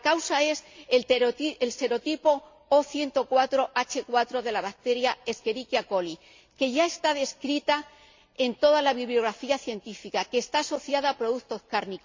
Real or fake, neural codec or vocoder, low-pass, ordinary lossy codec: real; none; 7.2 kHz; MP3, 64 kbps